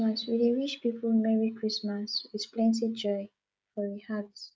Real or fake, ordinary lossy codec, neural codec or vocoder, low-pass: fake; none; codec, 16 kHz, 16 kbps, FreqCodec, smaller model; none